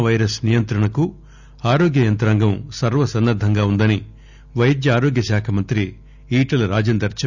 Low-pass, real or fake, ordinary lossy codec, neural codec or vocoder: 7.2 kHz; real; none; none